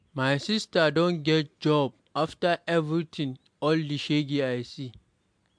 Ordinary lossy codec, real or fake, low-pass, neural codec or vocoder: MP3, 64 kbps; real; 9.9 kHz; none